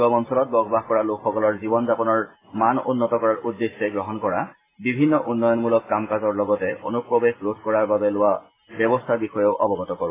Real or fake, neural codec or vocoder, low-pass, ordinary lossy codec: real; none; 3.6 kHz; AAC, 16 kbps